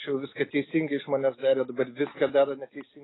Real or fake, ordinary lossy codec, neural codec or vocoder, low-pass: real; AAC, 16 kbps; none; 7.2 kHz